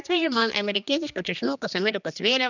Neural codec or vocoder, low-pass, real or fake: codec, 16 kHz, 2 kbps, X-Codec, HuBERT features, trained on general audio; 7.2 kHz; fake